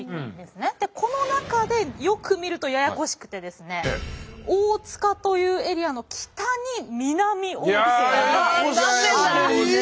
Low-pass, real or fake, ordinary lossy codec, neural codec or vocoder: none; real; none; none